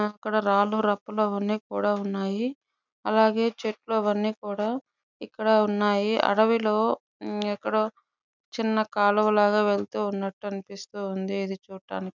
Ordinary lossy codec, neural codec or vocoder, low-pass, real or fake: none; none; 7.2 kHz; real